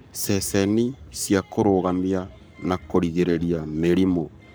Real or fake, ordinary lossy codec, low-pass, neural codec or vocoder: fake; none; none; codec, 44.1 kHz, 7.8 kbps, Pupu-Codec